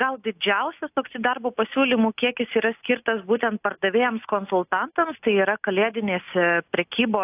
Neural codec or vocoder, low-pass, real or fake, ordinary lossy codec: none; 3.6 kHz; real; AAC, 32 kbps